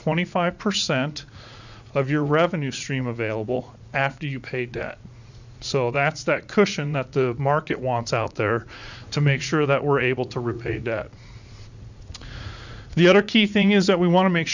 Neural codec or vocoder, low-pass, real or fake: vocoder, 44.1 kHz, 80 mel bands, Vocos; 7.2 kHz; fake